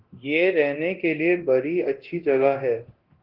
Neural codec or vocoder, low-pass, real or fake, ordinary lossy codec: codec, 24 kHz, 0.9 kbps, DualCodec; 5.4 kHz; fake; Opus, 16 kbps